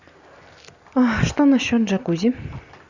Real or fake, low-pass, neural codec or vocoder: real; 7.2 kHz; none